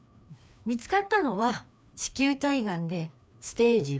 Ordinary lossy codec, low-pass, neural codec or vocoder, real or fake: none; none; codec, 16 kHz, 2 kbps, FreqCodec, larger model; fake